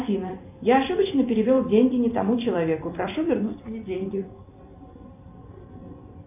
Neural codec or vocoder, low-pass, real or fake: vocoder, 44.1 kHz, 128 mel bands every 256 samples, BigVGAN v2; 3.6 kHz; fake